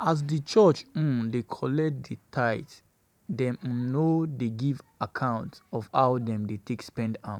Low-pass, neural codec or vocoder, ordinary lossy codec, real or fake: 19.8 kHz; vocoder, 44.1 kHz, 128 mel bands every 512 samples, BigVGAN v2; none; fake